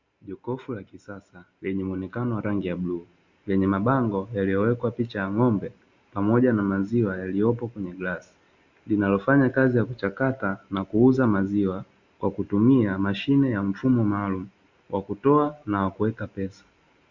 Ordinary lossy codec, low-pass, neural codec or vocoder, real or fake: Opus, 64 kbps; 7.2 kHz; none; real